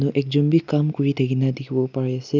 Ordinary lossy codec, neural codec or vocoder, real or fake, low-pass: none; none; real; 7.2 kHz